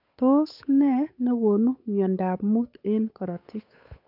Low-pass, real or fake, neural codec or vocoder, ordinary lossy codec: 5.4 kHz; fake; codec, 16 kHz, 8 kbps, FunCodec, trained on Chinese and English, 25 frames a second; none